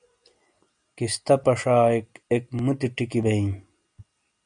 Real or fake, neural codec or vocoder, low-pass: real; none; 9.9 kHz